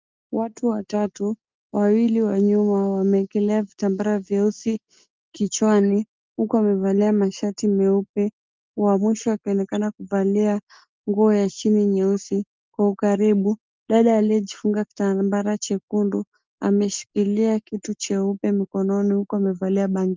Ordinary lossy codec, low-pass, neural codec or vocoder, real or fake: Opus, 24 kbps; 7.2 kHz; none; real